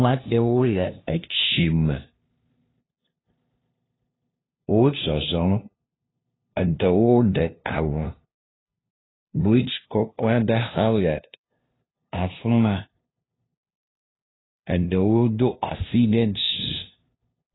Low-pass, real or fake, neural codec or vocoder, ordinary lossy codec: 7.2 kHz; fake; codec, 16 kHz, 0.5 kbps, FunCodec, trained on LibriTTS, 25 frames a second; AAC, 16 kbps